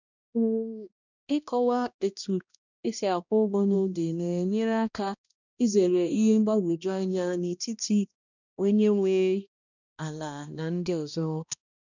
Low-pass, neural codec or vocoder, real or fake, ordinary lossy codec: 7.2 kHz; codec, 16 kHz, 1 kbps, X-Codec, HuBERT features, trained on balanced general audio; fake; none